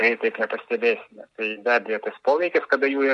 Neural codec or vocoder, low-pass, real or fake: none; 10.8 kHz; real